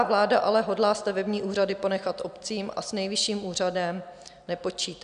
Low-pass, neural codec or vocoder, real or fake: 9.9 kHz; none; real